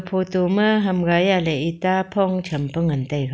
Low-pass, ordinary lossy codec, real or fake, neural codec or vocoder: none; none; real; none